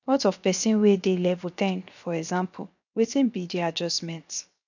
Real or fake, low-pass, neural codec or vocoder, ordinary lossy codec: fake; 7.2 kHz; codec, 16 kHz, 0.7 kbps, FocalCodec; none